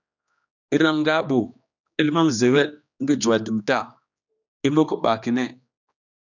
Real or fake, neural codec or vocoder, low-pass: fake; codec, 16 kHz, 2 kbps, X-Codec, HuBERT features, trained on general audio; 7.2 kHz